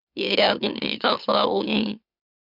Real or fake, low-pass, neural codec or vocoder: fake; 5.4 kHz; autoencoder, 44.1 kHz, a latent of 192 numbers a frame, MeloTTS